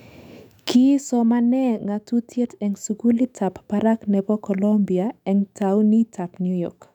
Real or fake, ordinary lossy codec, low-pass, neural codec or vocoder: fake; none; 19.8 kHz; autoencoder, 48 kHz, 128 numbers a frame, DAC-VAE, trained on Japanese speech